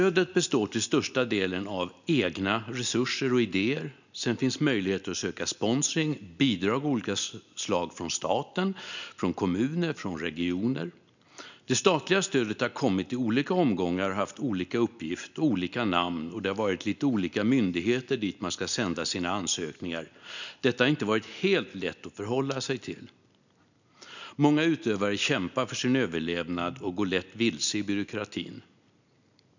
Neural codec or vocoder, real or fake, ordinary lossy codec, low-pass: none; real; none; 7.2 kHz